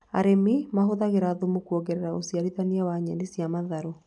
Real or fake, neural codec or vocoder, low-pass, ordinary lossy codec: real; none; 10.8 kHz; none